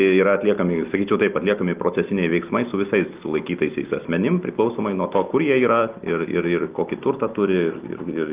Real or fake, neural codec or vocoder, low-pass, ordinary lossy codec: real; none; 3.6 kHz; Opus, 32 kbps